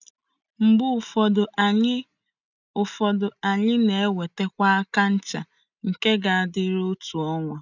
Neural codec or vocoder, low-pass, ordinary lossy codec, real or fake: none; 7.2 kHz; none; real